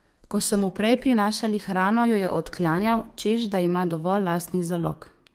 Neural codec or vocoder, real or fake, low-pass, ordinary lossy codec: codec, 32 kHz, 1.9 kbps, SNAC; fake; 14.4 kHz; Opus, 32 kbps